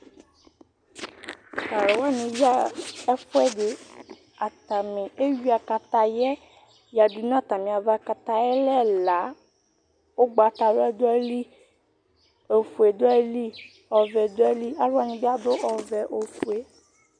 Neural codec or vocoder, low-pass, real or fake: none; 9.9 kHz; real